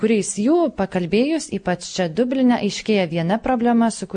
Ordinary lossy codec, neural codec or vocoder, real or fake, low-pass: MP3, 48 kbps; none; real; 9.9 kHz